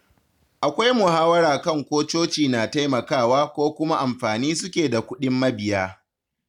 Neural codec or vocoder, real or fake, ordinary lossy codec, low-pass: none; real; none; 19.8 kHz